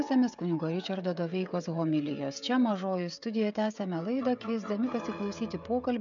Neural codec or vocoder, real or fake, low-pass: codec, 16 kHz, 16 kbps, FreqCodec, smaller model; fake; 7.2 kHz